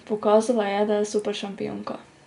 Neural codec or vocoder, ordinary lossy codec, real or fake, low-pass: none; none; real; 10.8 kHz